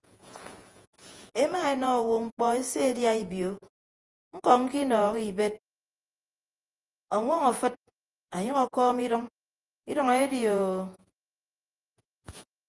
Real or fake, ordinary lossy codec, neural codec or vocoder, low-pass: fake; Opus, 24 kbps; vocoder, 48 kHz, 128 mel bands, Vocos; 10.8 kHz